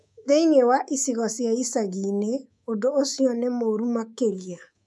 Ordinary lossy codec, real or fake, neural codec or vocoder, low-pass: none; fake; codec, 24 kHz, 3.1 kbps, DualCodec; none